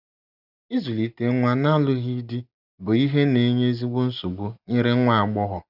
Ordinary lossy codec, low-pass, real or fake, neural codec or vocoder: none; 5.4 kHz; real; none